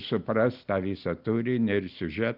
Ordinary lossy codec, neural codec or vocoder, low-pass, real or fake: Opus, 32 kbps; none; 5.4 kHz; real